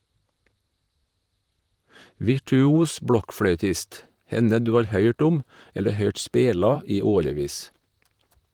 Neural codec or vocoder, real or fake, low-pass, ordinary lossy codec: vocoder, 44.1 kHz, 128 mel bands, Pupu-Vocoder; fake; 19.8 kHz; Opus, 24 kbps